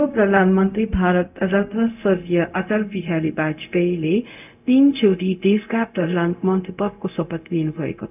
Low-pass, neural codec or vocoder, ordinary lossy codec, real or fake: 3.6 kHz; codec, 16 kHz, 0.4 kbps, LongCat-Audio-Codec; none; fake